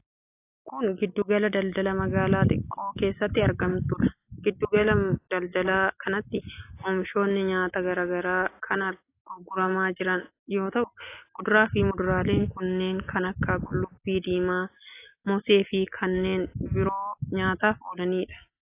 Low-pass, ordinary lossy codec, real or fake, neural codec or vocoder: 3.6 kHz; AAC, 24 kbps; real; none